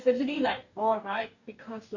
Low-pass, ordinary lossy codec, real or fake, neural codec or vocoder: 7.2 kHz; none; fake; codec, 44.1 kHz, 2.6 kbps, DAC